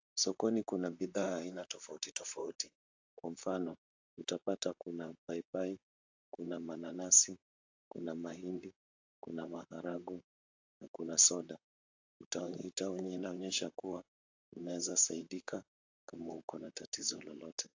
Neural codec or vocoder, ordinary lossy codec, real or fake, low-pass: vocoder, 44.1 kHz, 128 mel bands, Pupu-Vocoder; AAC, 48 kbps; fake; 7.2 kHz